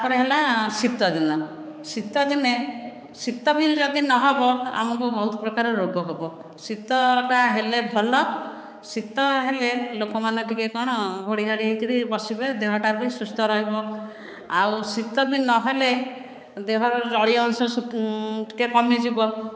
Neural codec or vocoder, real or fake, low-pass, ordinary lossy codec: codec, 16 kHz, 4 kbps, X-Codec, HuBERT features, trained on balanced general audio; fake; none; none